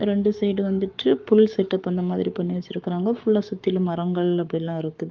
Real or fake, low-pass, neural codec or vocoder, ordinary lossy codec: fake; 7.2 kHz; codec, 44.1 kHz, 7.8 kbps, Pupu-Codec; Opus, 24 kbps